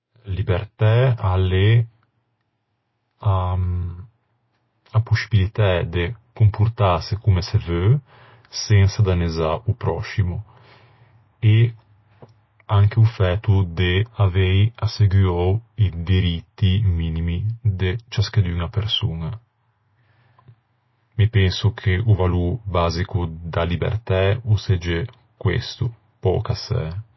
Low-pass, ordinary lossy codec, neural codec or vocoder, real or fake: 7.2 kHz; MP3, 24 kbps; none; real